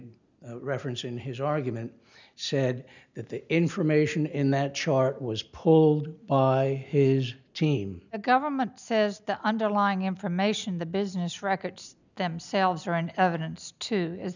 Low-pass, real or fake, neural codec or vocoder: 7.2 kHz; real; none